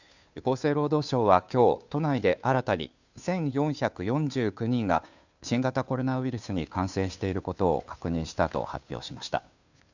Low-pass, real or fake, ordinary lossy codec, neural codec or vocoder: 7.2 kHz; fake; none; codec, 16 kHz, 2 kbps, FunCodec, trained on Chinese and English, 25 frames a second